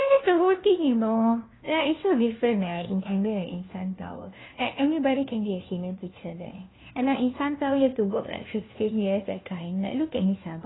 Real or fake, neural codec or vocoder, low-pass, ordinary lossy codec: fake; codec, 16 kHz, 1 kbps, FunCodec, trained on LibriTTS, 50 frames a second; 7.2 kHz; AAC, 16 kbps